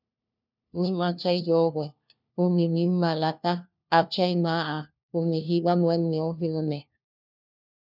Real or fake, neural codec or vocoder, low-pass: fake; codec, 16 kHz, 1 kbps, FunCodec, trained on LibriTTS, 50 frames a second; 5.4 kHz